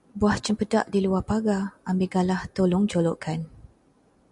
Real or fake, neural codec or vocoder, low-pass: real; none; 10.8 kHz